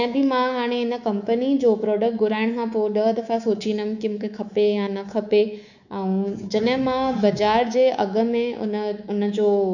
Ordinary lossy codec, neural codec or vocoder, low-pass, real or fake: none; codec, 24 kHz, 3.1 kbps, DualCodec; 7.2 kHz; fake